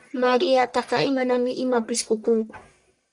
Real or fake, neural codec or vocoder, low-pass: fake; codec, 44.1 kHz, 1.7 kbps, Pupu-Codec; 10.8 kHz